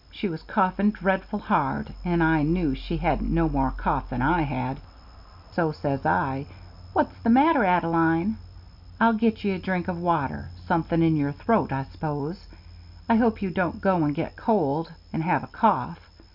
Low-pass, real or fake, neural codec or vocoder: 5.4 kHz; real; none